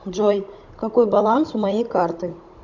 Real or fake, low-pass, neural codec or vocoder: fake; 7.2 kHz; codec, 16 kHz, 16 kbps, FunCodec, trained on Chinese and English, 50 frames a second